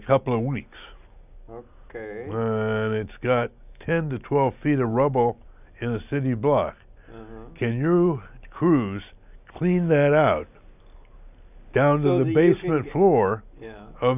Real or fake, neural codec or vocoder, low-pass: real; none; 3.6 kHz